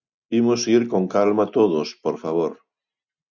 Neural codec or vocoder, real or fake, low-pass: none; real; 7.2 kHz